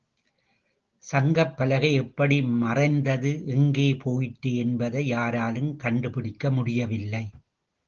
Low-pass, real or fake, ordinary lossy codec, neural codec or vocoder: 7.2 kHz; real; Opus, 16 kbps; none